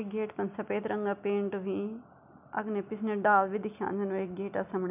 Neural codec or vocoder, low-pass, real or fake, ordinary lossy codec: none; 3.6 kHz; real; none